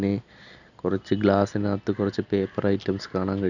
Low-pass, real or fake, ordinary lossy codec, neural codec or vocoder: 7.2 kHz; real; none; none